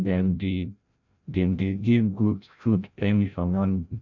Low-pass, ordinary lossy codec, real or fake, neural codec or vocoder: 7.2 kHz; none; fake; codec, 16 kHz, 0.5 kbps, FreqCodec, larger model